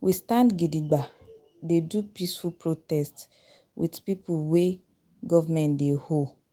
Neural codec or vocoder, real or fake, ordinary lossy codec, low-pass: autoencoder, 48 kHz, 128 numbers a frame, DAC-VAE, trained on Japanese speech; fake; Opus, 24 kbps; 19.8 kHz